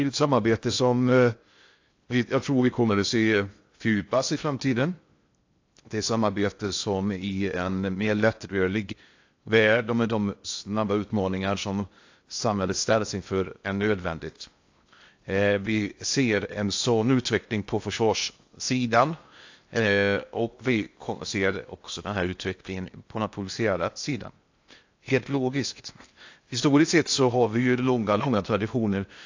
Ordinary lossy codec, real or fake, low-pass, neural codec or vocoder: AAC, 48 kbps; fake; 7.2 kHz; codec, 16 kHz in and 24 kHz out, 0.6 kbps, FocalCodec, streaming, 2048 codes